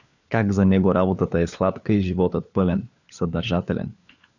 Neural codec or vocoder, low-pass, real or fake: codec, 16 kHz, 4 kbps, FunCodec, trained on LibriTTS, 50 frames a second; 7.2 kHz; fake